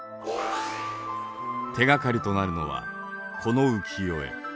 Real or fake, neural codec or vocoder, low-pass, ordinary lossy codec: real; none; none; none